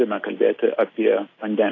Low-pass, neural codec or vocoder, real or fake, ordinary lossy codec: 7.2 kHz; none; real; AAC, 32 kbps